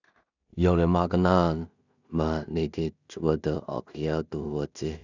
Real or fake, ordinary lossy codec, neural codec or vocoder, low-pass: fake; none; codec, 16 kHz in and 24 kHz out, 0.4 kbps, LongCat-Audio-Codec, two codebook decoder; 7.2 kHz